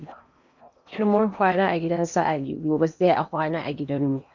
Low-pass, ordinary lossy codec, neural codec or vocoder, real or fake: 7.2 kHz; none; codec, 16 kHz in and 24 kHz out, 0.8 kbps, FocalCodec, streaming, 65536 codes; fake